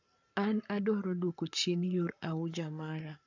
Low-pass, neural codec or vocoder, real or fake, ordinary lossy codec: 7.2 kHz; codec, 44.1 kHz, 7.8 kbps, Pupu-Codec; fake; none